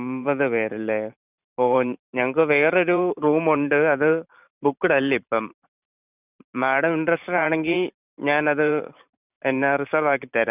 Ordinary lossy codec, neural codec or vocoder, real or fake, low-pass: none; vocoder, 44.1 kHz, 128 mel bands every 512 samples, BigVGAN v2; fake; 3.6 kHz